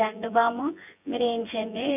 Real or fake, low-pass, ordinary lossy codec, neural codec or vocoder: fake; 3.6 kHz; none; vocoder, 24 kHz, 100 mel bands, Vocos